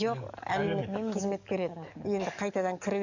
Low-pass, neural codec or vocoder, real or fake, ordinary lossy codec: 7.2 kHz; codec, 44.1 kHz, 7.8 kbps, DAC; fake; none